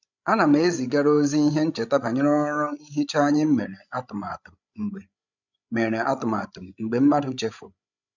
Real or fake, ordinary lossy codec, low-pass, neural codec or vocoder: fake; none; 7.2 kHz; codec, 16 kHz, 16 kbps, FreqCodec, larger model